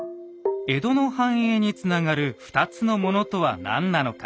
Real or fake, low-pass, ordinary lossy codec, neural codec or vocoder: real; none; none; none